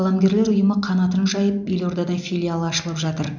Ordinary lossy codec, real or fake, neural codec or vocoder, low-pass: none; real; none; 7.2 kHz